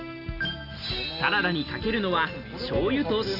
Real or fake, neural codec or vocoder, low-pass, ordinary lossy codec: real; none; 5.4 kHz; none